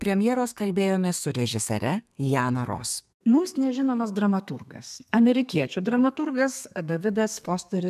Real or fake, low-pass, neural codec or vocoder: fake; 14.4 kHz; codec, 32 kHz, 1.9 kbps, SNAC